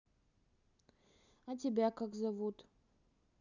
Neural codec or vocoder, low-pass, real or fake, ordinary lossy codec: none; 7.2 kHz; real; none